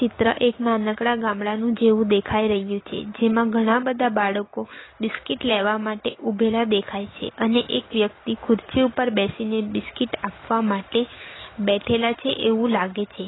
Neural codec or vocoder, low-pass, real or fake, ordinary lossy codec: none; 7.2 kHz; real; AAC, 16 kbps